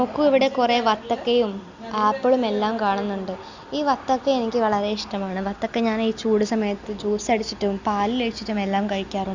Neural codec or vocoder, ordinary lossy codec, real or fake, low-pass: none; Opus, 64 kbps; real; 7.2 kHz